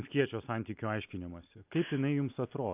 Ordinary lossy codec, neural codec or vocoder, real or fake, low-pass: AAC, 32 kbps; none; real; 3.6 kHz